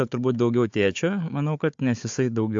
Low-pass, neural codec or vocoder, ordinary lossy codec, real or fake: 7.2 kHz; codec, 16 kHz, 4 kbps, FunCodec, trained on Chinese and English, 50 frames a second; AAC, 64 kbps; fake